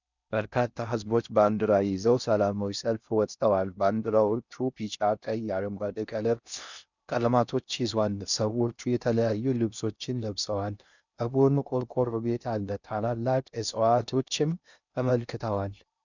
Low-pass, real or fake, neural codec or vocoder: 7.2 kHz; fake; codec, 16 kHz in and 24 kHz out, 0.6 kbps, FocalCodec, streaming, 4096 codes